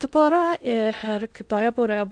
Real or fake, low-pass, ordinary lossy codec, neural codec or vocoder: fake; 9.9 kHz; AAC, 64 kbps; codec, 16 kHz in and 24 kHz out, 0.8 kbps, FocalCodec, streaming, 65536 codes